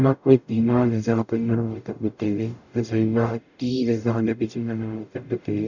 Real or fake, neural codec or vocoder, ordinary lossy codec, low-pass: fake; codec, 44.1 kHz, 0.9 kbps, DAC; none; 7.2 kHz